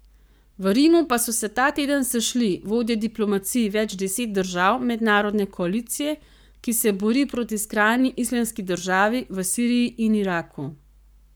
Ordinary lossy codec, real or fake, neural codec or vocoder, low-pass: none; fake; codec, 44.1 kHz, 7.8 kbps, Pupu-Codec; none